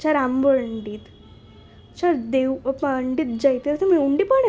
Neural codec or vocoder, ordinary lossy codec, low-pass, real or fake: none; none; none; real